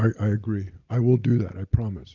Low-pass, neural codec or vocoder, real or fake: 7.2 kHz; none; real